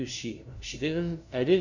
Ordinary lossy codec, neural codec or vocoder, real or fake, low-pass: none; codec, 16 kHz, 0.5 kbps, FunCodec, trained on LibriTTS, 25 frames a second; fake; 7.2 kHz